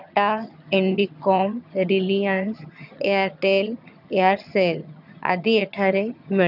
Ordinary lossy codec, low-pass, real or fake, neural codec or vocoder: none; 5.4 kHz; fake; vocoder, 22.05 kHz, 80 mel bands, HiFi-GAN